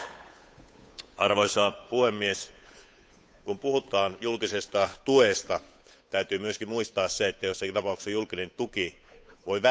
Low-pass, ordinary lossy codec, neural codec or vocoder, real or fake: none; none; codec, 16 kHz, 8 kbps, FunCodec, trained on Chinese and English, 25 frames a second; fake